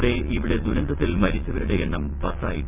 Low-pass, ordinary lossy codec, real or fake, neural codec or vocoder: 3.6 kHz; none; fake; vocoder, 22.05 kHz, 80 mel bands, WaveNeXt